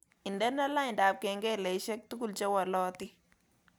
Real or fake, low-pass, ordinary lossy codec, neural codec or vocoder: real; none; none; none